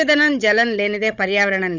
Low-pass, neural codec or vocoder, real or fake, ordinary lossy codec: 7.2 kHz; codec, 16 kHz, 16 kbps, FunCodec, trained on Chinese and English, 50 frames a second; fake; none